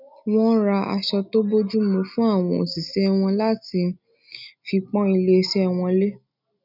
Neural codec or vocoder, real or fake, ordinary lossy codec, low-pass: none; real; none; 5.4 kHz